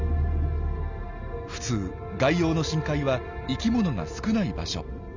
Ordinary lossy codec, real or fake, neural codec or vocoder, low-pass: none; real; none; 7.2 kHz